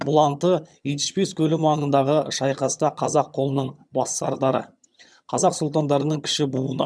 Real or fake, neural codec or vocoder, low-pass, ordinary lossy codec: fake; vocoder, 22.05 kHz, 80 mel bands, HiFi-GAN; none; none